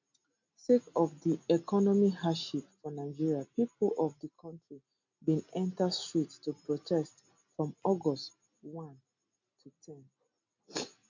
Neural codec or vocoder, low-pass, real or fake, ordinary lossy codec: none; 7.2 kHz; real; MP3, 64 kbps